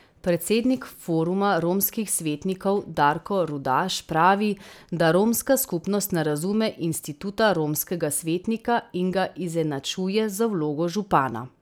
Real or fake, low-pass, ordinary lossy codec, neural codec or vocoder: real; none; none; none